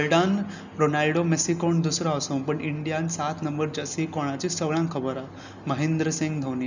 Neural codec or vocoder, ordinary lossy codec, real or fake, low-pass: none; none; real; 7.2 kHz